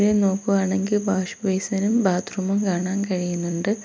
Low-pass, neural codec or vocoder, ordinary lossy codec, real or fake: none; none; none; real